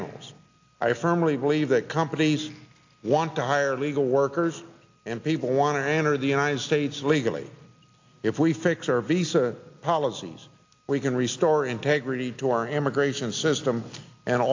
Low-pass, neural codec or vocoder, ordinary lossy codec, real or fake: 7.2 kHz; none; AAC, 48 kbps; real